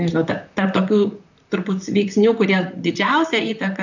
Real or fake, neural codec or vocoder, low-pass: fake; vocoder, 44.1 kHz, 128 mel bands, Pupu-Vocoder; 7.2 kHz